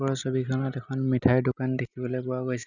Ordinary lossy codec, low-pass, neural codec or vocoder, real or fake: none; 7.2 kHz; none; real